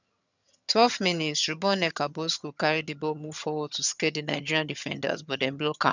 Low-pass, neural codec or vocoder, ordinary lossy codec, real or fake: 7.2 kHz; vocoder, 22.05 kHz, 80 mel bands, HiFi-GAN; none; fake